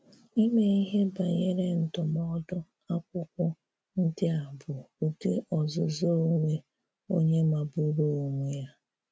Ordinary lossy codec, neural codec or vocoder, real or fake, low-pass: none; none; real; none